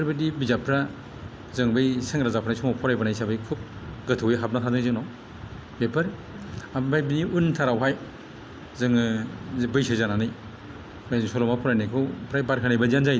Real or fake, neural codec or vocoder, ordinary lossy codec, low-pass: real; none; none; none